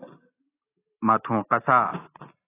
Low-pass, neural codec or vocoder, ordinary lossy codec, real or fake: 3.6 kHz; none; AAC, 16 kbps; real